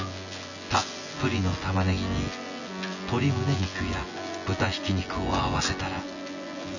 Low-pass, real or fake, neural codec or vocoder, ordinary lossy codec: 7.2 kHz; fake; vocoder, 24 kHz, 100 mel bands, Vocos; none